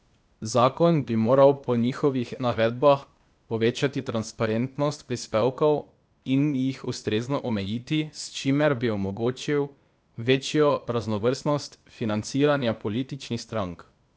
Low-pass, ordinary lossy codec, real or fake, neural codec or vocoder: none; none; fake; codec, 16 kHz, 0.8 kbps, ZipCodec